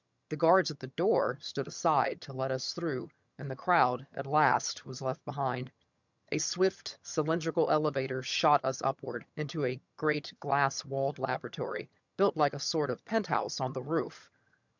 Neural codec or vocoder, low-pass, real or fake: vocoder, 22.05 kHz, 80 mel bands, HiFi-GAN; 7.2 kHz; fake